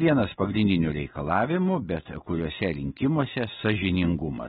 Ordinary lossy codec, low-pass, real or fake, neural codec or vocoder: AAC, 16 kbps; 19.8 kHz; real; none